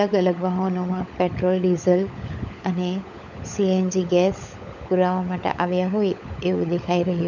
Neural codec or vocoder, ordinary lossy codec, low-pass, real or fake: codec, 16 kHz, 16 kbps, FunCodec, trained on LibriTTS, 50 frames a second; none; 7.2 kHz; fake